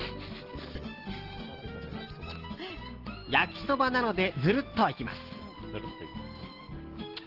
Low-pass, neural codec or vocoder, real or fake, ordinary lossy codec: 5.4 kHz; none; real; Opus, 16 kbps